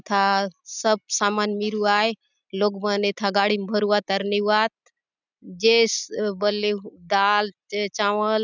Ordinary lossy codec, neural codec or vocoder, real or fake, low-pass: none; none; real; 7.2 kHz